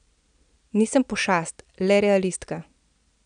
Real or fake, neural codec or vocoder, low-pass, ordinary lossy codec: real; none; 9.9 kHz; none